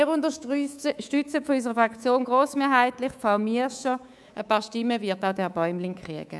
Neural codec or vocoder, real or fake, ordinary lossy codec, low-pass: codec, 24 kHz, 3.1 kbps, DualCodec; fake; none; none